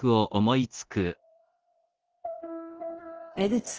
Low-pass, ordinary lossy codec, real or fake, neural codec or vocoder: 7.2 kHz; Opus, 16 kbps; fake; codec, 24 kHz, 0.9 kbps, DualCodec